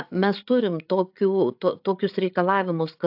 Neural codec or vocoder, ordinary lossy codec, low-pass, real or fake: codec, 16 kHz, 8 kbps, FreqCodec, larger model; AAC, 48 kbps; 5.4 kHz; fake